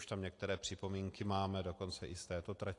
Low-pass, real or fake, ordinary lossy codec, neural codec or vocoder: 10.8 kHz; real; AAC, 48 kbps; none